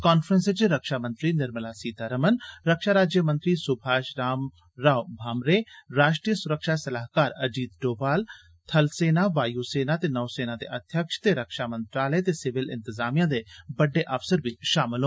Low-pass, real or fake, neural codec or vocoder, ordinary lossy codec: none; real; none; none